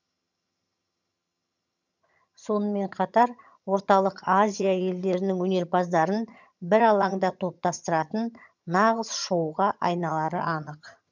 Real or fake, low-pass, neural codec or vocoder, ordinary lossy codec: fake; 7.2 kHz; vocoder, 22.05 kHz, 80 mel bands, HiFi-GAN; none